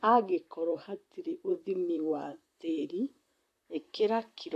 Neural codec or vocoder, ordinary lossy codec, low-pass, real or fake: vocoder, 44.1 kHz, 128 mel bands, Pupu-Vocoder; AAC, 64 kbps; 14.4 kHz; fake